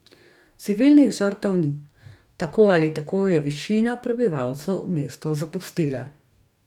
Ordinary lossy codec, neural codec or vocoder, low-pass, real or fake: none; codec, 44.1 kHz, 2.6 kbps, DAC; 19.8 kHz; fake